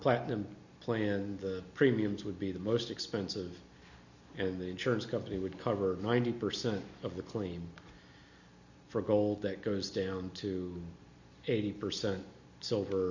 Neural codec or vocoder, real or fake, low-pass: none; real; 7.2 kHz